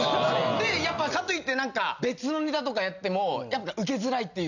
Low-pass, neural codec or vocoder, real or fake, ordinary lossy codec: 7.2 kHz; none; real; none